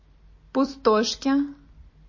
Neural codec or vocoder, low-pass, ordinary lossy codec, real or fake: none; 7.2 kHz; MP3, 32 kbps; real